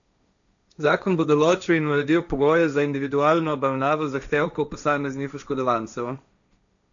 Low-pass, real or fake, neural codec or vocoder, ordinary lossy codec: 7.2 kHz; fake; codec, 16 kHz, 1.1 kbps, Voila-Tokenizer; none